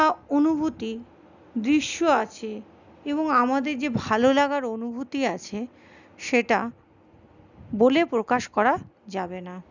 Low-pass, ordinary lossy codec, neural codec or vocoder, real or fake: 7.2 kHz; none; none; real